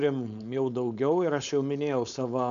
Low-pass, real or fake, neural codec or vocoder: 7.2 kHz; fake; codec, 16 kHz, 4.8 kbps, FACodec